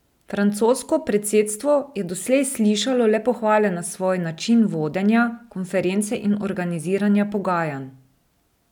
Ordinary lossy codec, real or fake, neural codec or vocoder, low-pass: none; real; none; 19.8 kHz